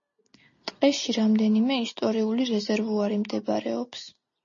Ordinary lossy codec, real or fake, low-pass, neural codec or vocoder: MP3, 32 kbps; real; 7.2 kHz; none